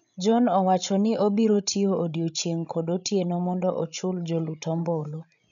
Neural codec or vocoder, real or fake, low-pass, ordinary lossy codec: codec, 16 kHz, 16 kbps, FreqCodec, larger model; fake; 7.2 kHz; none